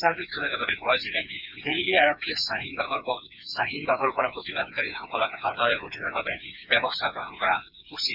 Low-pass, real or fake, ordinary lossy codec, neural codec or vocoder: 5.4 kHz; fake; none; codec, 16 kHz, 4 kbps, FreqCodec, smaller model